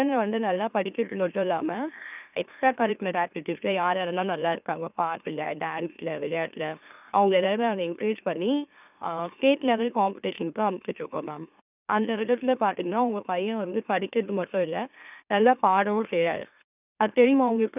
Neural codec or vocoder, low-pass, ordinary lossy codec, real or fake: autoencoder, 44.1 kHz, a latent of 192 numbers a frame, MeloTTS; 3.6 kHz; none; fake